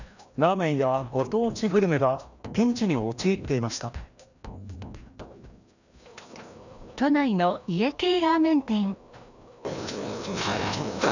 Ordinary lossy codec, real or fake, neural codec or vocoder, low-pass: none; fake; codec, 16 kHz, 1 kbps, FreqCodec, larger model; 7.2 kHz